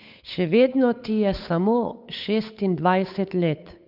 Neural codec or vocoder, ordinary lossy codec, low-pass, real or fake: codec, 16 kHz, 8 kbps, FunCodec, trained on Chinese and English, 25 frames a second; none; 5.4 kHz; fake